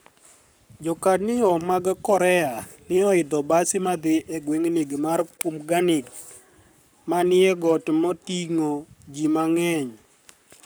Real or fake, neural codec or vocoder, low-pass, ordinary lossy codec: fake; codec, 44.1 kHz, 7.8 kbps, Pupu-Codec; none; none